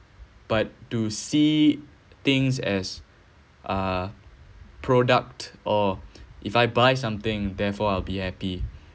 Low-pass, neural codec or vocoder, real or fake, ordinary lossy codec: none; none; real; none